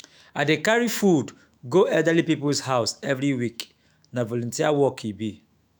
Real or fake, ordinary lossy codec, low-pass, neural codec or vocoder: fake; none; none; autoencoder, 48 kHz, 128 numbers a frame, DAC-VAE, trained on Japanese speech